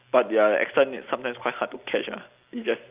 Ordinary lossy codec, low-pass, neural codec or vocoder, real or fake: Opus, 24 kbps; 3.6 kHz; none; real